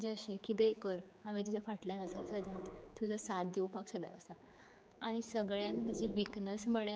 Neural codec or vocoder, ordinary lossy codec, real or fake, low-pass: codec, 16 kHz, 4 kbps, X-Codec, HuBERT features, trained on general audio; none; fake; none